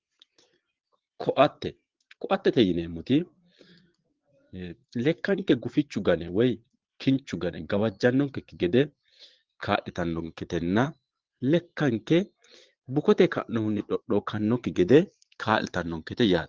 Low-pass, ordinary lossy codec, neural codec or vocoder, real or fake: 7.2 kHz; Opus, 16 kbps; vocoder, 22.05 kHz, 80 mel bands, Vocos; fake